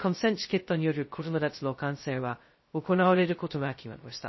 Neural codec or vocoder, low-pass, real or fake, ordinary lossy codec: codec, 16 kHz, 0.2 kbps, FocalCodec; 7.2 kHz; fake; MP3, 24 kbps